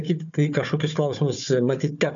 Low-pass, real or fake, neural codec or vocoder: 7.2 kHz; fake; codec, 16 kHz, 4 kbps, FunCodec, trained on Chinese and English, 50 frames a second